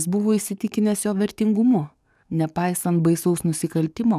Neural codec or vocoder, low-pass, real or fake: codec, 44.1 kHz, 7.8 kbps, DAC; 14.4 kHz; fake